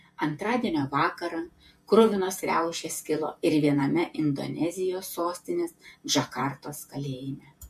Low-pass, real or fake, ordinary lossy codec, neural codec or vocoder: 14.4 kHz; fake; MP3, 64 kbps; vocoder, 48 kHz, 128 mel bands, Vocos